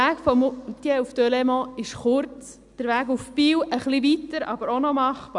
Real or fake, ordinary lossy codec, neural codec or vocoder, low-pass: real; none; none; 10.8 kHz